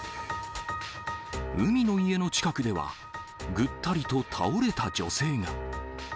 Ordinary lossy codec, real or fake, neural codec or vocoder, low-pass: none; real; none; none